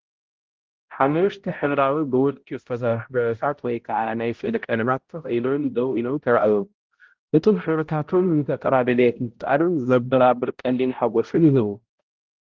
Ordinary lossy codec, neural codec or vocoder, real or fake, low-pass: Opus, 16 kbps; codec, 16 kHz, 0.5 kbps, X-Codec, HuBERT features, trained on balanced general audio; fake; 7.2 kHz